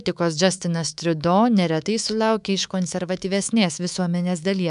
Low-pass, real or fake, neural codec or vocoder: 10.8 kHz; fake; codec, 24 kHz, 3.1 kbps, DualCodec